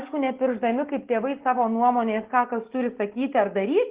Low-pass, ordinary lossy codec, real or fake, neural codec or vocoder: 3.6 kHz; Opus, 16 kbps; real; none